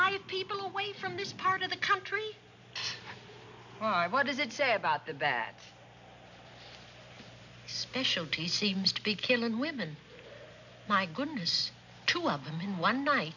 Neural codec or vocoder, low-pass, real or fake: none; 7.2 kHz; real